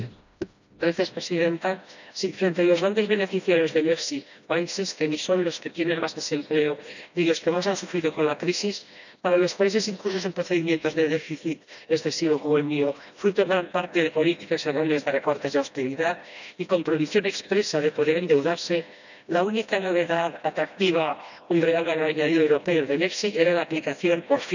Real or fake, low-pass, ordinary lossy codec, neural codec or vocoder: fake; 7.2 kHz; none; codec, 16 kHz, 1 kbps, FreqCodec, smaller model